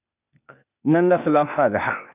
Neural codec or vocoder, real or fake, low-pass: codec, 16 kHz, 0.8 kbps, ZipCodec; fake; 3.6 kHz